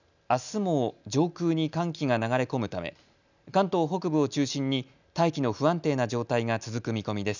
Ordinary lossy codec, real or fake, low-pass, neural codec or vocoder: none; real; 7.2 kHz; none